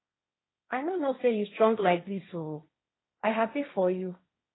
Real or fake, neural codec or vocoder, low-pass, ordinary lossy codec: fake; codec, 16 kHz, 1.1 kbps, Voila-Tokenizer; 7.2 kHz; AAC, 16 kbps